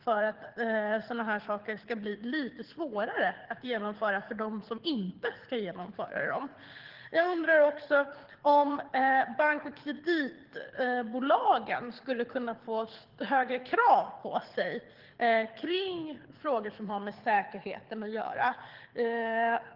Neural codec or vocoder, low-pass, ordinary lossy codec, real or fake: codec, 24 kHz, 6 kbps, HILCodec; 5.4 kHz; Opus, 16 kbps; fake